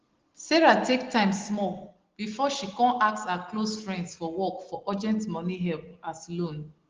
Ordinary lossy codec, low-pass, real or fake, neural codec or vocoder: Opus, 16 kbps; 7.2 kHz; real; none